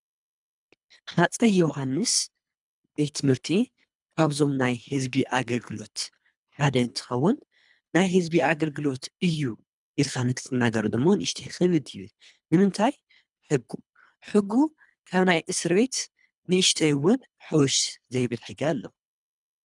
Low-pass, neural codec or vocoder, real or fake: 10.8 kHz; codec, 24 kHz, 3 kbps, HILCodec; fake